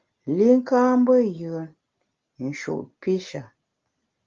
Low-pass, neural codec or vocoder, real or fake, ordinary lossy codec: 7.2 kHz; none; real; Opus, 32 kbps